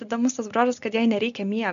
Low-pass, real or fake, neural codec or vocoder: 7.2 kHz; real; none